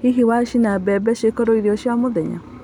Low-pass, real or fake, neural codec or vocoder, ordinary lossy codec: 19.8 kHz; real; none; none